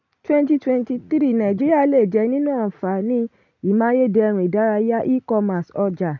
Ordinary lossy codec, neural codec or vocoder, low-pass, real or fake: none; none; 7.2 kHz; real